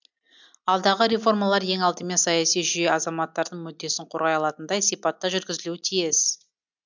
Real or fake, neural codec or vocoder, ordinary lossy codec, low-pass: real; none; MP3, 64 kbps; 7.2 kHz